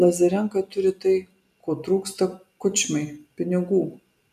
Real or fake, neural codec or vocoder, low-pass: real; none; 14.4 kHz